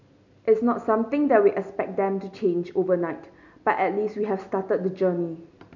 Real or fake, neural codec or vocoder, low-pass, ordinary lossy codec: real; none; 7.2 kHz; none